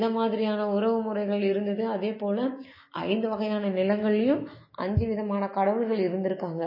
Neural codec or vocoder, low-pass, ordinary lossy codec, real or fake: autoencoder, 48 kHz, 128 numbers a frame, DAC-VAE, trained on Japanese speech; 5.4 kHz; MP3, 24 kbps; fake